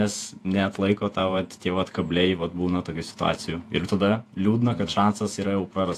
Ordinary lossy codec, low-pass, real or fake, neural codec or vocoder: AAC, 64 kbps; 14.4 kHz; fake; vocoder, 48 kHz, 128 mel bands, Vocos